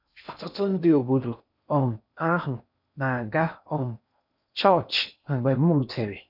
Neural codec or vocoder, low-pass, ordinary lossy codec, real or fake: codec, 16 kHz in and 24 kHz out, 0.6 kbps, FocalCodec, streaming, 4096 codes; 5.4 kHz; none; fake